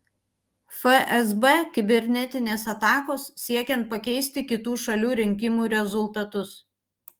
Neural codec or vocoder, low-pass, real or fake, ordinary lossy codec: autoencoder, 48 kHz, 128 numbers a frame, DAC-VAE, trained on Japanese speech; 19.8 kHz; fake; Opus, 24 kbps